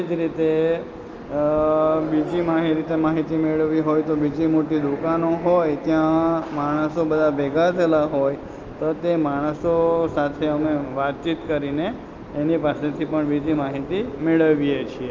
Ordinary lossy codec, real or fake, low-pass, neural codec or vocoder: Opus, 24 kbps; real; 7.2 kHz; none